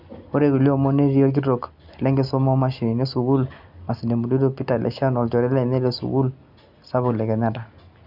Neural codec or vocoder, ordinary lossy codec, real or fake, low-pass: none; none; real; 5.4 kHz